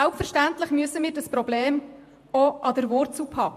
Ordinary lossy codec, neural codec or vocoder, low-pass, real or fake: MP3, 64 kbps; vocoder, 48 kHz, 128 mel bands, Vocos; 14.4 kHz; fake